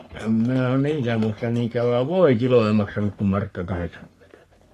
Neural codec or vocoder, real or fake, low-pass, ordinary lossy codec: codec, 44.1 kHz, 3.4 kbps, Pupu-Codec; fake; 14.4 kHz; AAC, 64 kbps